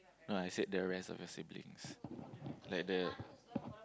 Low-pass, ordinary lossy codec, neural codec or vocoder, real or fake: none; none; none; real